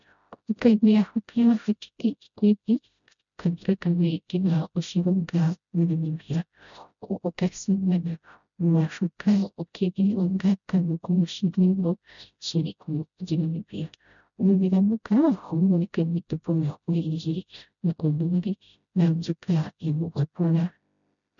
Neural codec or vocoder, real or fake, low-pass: codec, 16 kHz, 0.5 kbps, FreqCodec, smaller model; fake; 7.2 kHz